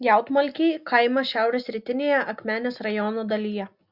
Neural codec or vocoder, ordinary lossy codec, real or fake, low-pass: none; Opus, 64 kbps; real; 5.4 kHz